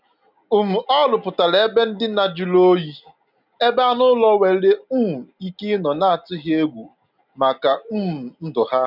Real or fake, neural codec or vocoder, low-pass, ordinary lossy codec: real; none; 5.4 kHz; none